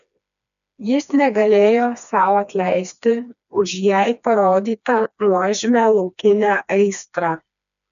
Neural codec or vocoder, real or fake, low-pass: codec, 16 kHz, 2 kbps, FreqCodec, smaller model; fake; 7.2 kHz